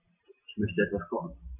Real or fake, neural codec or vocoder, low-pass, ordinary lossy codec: real; none; 3.6 kHz; none